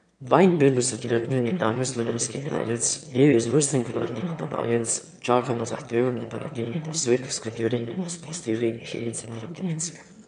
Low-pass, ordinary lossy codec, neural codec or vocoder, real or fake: 9.9 kHz; MP3, 64 kbps; autoencoder, 22.05 kHz, a latent of 192 numbers a frame, VITS, trained on one speaker; fake